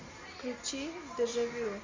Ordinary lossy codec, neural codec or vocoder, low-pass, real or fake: MP3, 48 kbps; none; 7.2 kHz; real